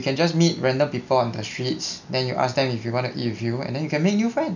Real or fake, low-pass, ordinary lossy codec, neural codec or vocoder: real; 7.2 kHz; none; none